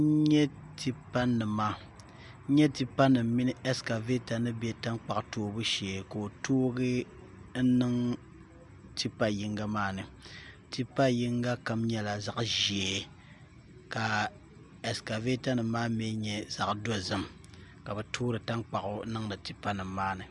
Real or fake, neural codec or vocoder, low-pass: real; none; 10.8 kHz